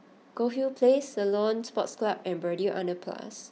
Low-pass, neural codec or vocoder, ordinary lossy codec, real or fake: none; none; none; real